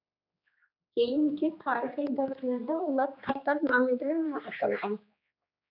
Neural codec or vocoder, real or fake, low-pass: codec, 16 kHz, 2 kbps, X-Codec, HuBERT features, trained on general audio; fake; 5.4 kHz